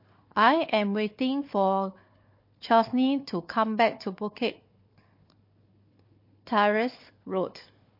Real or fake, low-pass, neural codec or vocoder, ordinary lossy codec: fake; 5.4 kHz; codec, 16 kHz, 4 kbps, FunCodec, trained on Chinese and English, 50 frames a second; MP3, 32 kbps